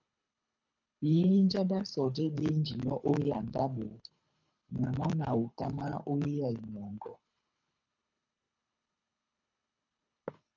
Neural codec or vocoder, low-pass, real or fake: codec, 24 kHz, 3 kbps, HILCodec; 7.2 kHz; fake